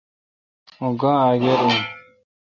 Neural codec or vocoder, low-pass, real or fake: none; 7.2 kHz; real